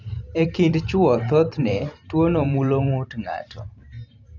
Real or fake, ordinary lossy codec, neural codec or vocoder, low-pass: fake; none; vocoder, 44.1 kHz, 128 mel bands every 512 samples, BigVGAN v2; 7.2 kHz